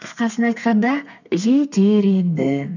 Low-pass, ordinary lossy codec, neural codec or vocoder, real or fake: 7.2 kHz; none; codec, 32 kHz, 1.9 kbps, SNAC; fake